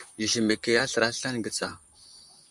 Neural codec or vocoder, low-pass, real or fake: vocoder, 44.1 kHz, 128 mel bands, Pupu-Vocoder; 10.8 kHz; fake